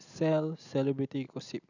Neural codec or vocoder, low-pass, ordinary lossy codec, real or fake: none; 7.2 kHz; none; real